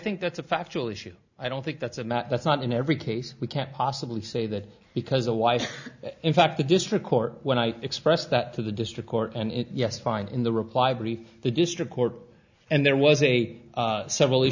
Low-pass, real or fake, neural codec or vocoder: 7.2 kHz; real; none